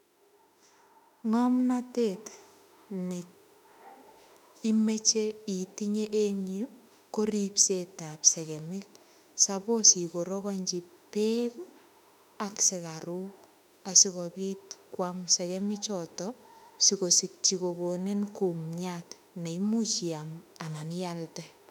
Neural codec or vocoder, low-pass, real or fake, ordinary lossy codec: autoencoder, 48 kHz, 32 numbers a frame, DAC-VAE, trained on Japanese speech; 19.8 kHz; fake; none